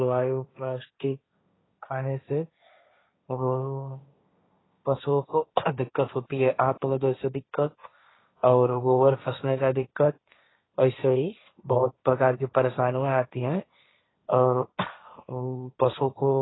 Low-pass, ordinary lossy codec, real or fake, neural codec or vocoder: 7.2 kHz; AAC, 16 kbps; fake; codec, 16 kHz, 1.1 kbps, Voila-Tokenizer